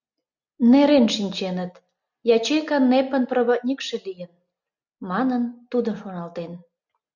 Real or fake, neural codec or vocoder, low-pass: real; none; 7.2 kHz